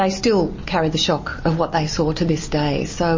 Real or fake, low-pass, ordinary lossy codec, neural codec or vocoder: real; 7.2 kHz; MP3, 32 kbps; none